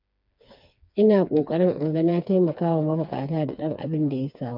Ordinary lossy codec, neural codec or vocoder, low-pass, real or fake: MP3, 48 kbps; codec, 16 kHz, 4 kbps, FreqCodec, smaller model; 5.4 kHz; fake